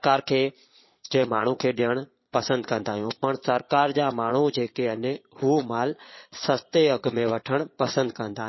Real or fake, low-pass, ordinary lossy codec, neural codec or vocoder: fake; 7.2 kHz; MP3, 24 kbps; vocoder, 22.05 kHz, 80 mel bands, Vocos